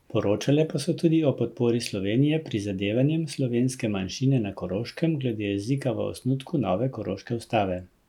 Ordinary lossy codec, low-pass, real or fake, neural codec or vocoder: none; 19.8 kHz; real; none